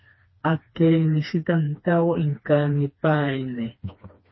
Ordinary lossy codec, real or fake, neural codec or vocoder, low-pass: MP3, 24 kbps; fake; codec, 16 kHz, 2 kbps, FreqCodec, smaller model; 7.2 kHz